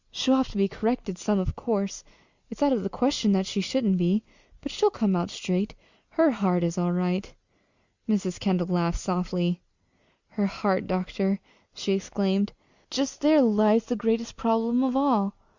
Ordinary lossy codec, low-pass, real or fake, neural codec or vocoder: Opus, 64 kbps; 7.2 kHz; real; none